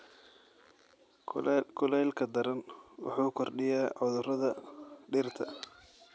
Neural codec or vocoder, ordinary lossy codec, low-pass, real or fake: none; none; none; real